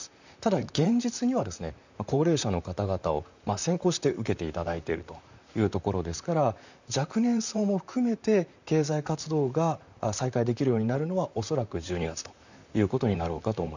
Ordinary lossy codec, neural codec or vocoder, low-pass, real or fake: none; vocoder, 44.1 kHz, 128 mel bands, Pupu-Vocoder; 7.2 kHz; fake